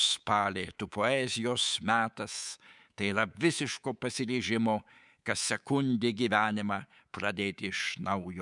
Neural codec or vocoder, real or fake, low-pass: codec, 24 kHz, 3.1 kbps, DualCodec; fake; 10.8 kHz